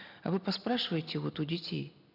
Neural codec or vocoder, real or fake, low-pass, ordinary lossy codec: none; real; 5.4 kHz; none